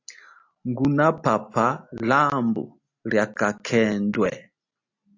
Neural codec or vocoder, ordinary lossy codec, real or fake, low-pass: none; AAC, 48 kbps; real; 7.2 kHz